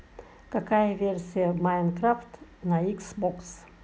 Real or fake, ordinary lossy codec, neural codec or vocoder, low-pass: real; none; none; none